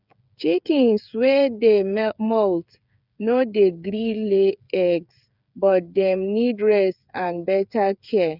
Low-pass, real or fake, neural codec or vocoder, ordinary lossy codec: 5.4 kHz; fake; codec, 16 kHz, 8 kbps, FreqCodec, smaller model; none